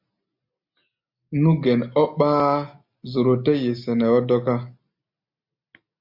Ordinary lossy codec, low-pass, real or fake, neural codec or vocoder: MP3, 48 kbps; 5.4 kHz; real; none